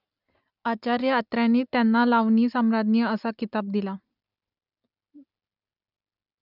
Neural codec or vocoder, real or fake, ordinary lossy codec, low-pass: none; real; none; 5.4 kHz